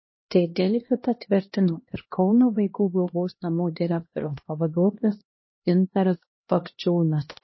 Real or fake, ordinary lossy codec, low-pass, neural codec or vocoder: fake; MP3, 24 kbps; 7.2 kHz; codec, 16 kHz, 1 kbps, X-Codec, HuBERT features, trained on LibriSpeech